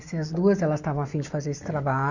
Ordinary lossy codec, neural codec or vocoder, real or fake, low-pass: none; none; real; 7.2 kHz